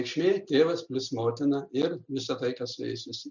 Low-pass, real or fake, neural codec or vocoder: 7.2 kHz; real; none